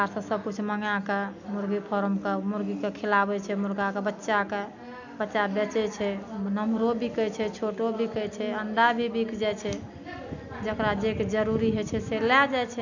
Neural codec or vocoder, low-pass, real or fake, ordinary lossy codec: none; 7.2 kHz; real; none